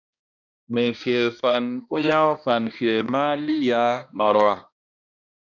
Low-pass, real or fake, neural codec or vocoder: 7.2 kHz; fake; codec, 16 kHz, 1 kbps, X-Codec, HuBERT features, trained on balanced general audio